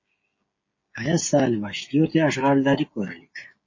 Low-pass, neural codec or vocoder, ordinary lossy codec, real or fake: 7.2 kHz; codec, 16 kHz, 16 kbps, FreqCodec, smaller model; MP3, 32 kbps; fake